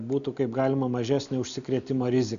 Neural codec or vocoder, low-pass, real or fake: none; 7.2 kHz; real